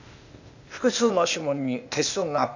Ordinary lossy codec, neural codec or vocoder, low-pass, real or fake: none; codec, 16 kHz, 0.8 kbps, ZipCodec; 7.2 kHz; fake